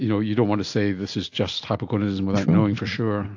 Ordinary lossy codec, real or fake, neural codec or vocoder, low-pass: AAC, 48 kbps; real; none; 7.2 kHz